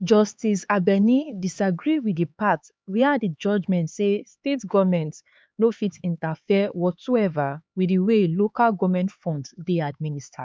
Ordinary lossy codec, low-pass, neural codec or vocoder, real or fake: none; none; codec, 16 kHz, 4 kbps, X-Codec, HuBERT features, trained on LibriSpeech; fake